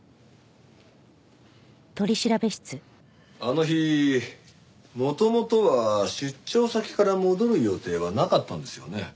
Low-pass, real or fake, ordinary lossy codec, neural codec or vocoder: none; real; none; none